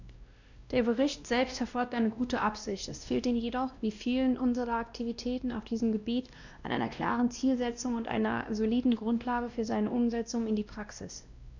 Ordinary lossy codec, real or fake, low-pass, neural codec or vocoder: none; fake; 7.2 kHz; codec, 16 kHz, 1 kbps, X-Codec, WavLM features, trained on Multilingual LibriSpeech